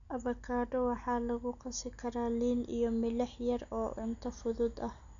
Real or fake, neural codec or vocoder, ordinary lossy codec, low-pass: real; none; none; 7.2 kHz